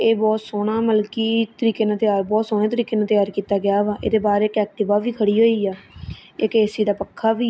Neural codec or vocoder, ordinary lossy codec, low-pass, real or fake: none; none; none; real